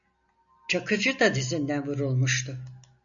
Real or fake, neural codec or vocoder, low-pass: real; none; 7.2 kHz